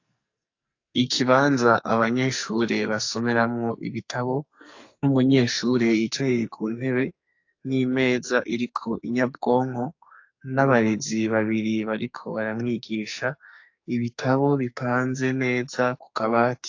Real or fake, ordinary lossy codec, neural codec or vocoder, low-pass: fake; AAC, 48 kbps; codec, 44.1 kHz, 2.6 kbps, SNAC; 7.2 kHz